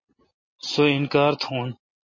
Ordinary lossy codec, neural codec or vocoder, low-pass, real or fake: MP3, 32 kbps; none; 7.2 kHz; real